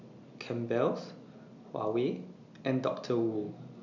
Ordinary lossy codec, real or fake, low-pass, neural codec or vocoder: none; real; 7.2 kHz; none